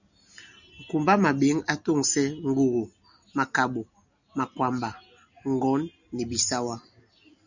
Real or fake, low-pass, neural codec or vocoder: real; 7.2 kHz; none